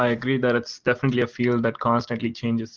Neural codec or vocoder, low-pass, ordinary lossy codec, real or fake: none; 7.2 kHz; Opus, 16 kbps; real